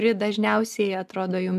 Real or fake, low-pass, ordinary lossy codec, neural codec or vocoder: real; 14.4 kHz; MP3, 96 kbps; none